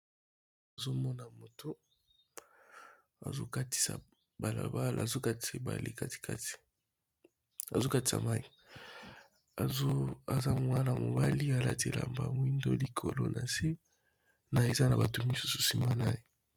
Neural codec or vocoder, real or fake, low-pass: none; real; 19.8 kHz